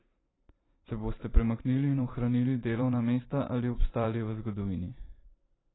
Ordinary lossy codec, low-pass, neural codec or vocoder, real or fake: AAC, 16 kbps; 7.2 kHz; vocoder, 44.1 kHz, 128 mel bands every 512 samples, BigVGAN v2; fake